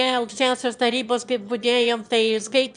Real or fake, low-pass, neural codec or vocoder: fake; 9.9 kHz; autoencoder, 22.05 kHz, a latent of 192 numbers a frame, VITS, trained on one speaker